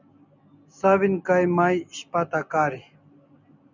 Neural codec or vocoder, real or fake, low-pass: none; real; 7.2 kHz